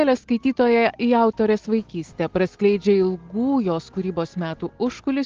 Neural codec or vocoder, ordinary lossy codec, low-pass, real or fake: none; Opus, 16 kbps; 7.2 kHz; real